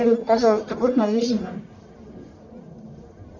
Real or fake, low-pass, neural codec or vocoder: fake; 7.2 kHz; codec, 44.1 kHz, 1.7 kbps, Pupu-Codec